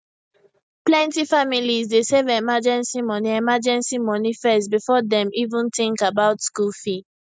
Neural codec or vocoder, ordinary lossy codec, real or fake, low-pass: none; none; real; none